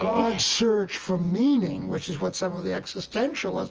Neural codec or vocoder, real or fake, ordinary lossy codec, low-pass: vocoder, 24 kHz, 100 mel bands, Vocos; fake; Opus, 24 kbps; 7.2 kHz